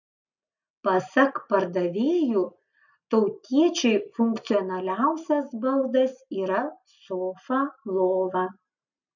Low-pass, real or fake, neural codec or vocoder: 7.2 kHz; real; none